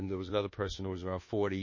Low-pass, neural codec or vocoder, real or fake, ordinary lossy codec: 7.2 kHz; codec, 24 kHz, 1.2 kbps, DualCodec; fake; MP3, 32 kbps